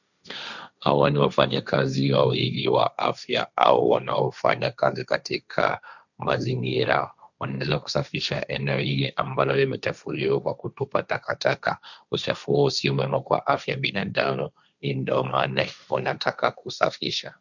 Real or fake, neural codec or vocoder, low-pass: fake; codec, 16 kHz, 1.1 kbps, Voila-Tokenizer; 7.2 kHz